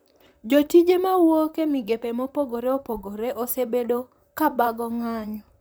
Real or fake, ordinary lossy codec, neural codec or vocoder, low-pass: fake; none; vocoder, 44.1 kHz, 128 mel bands, Pupu-Vocoder; none